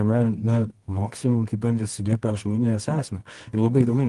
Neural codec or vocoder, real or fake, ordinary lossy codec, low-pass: codec, 24 kHz, 0.9 kbps, WavTokenizer, medium music audio release; fake; Opus, 24 kbps; 10.8 kHz